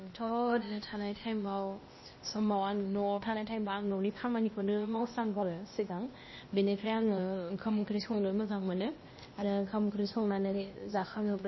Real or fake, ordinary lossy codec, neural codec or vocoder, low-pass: fake; MP3, 24 kbps; codec, 16 kHz, 0.8 kbps, ZipCodec; 7.2 kHz